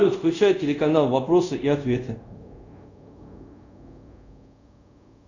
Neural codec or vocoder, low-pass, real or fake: codec, 24 kHz, 0.5 kbps, DualCodec; 7.2 kHz; fake